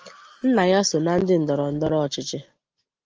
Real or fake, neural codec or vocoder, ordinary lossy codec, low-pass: real; none; Opus, 24 kbps; 7.2 kHz